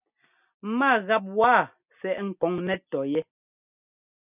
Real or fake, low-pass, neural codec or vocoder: real; 3.6 kHz; none